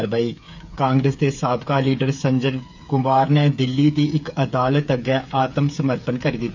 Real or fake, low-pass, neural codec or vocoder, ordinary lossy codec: fake; 7.2 kHz; codec, 16 kHz, 8 kbps, FreqCodec, smaller model; MP3, 64 kbps